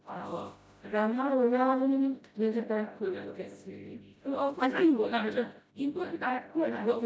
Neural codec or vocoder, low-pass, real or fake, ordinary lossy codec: codec, 16 kHz, 0.5 kbps, FreqCodec, smaller model; none; fake; none